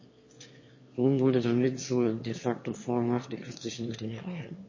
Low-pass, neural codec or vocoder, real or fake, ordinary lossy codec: 7.2 kHz; autoencoder, 22.05 kHz, a latent of 192 numbers a frame, VITS, trained on one speaker; fake; MP3, 32 kbps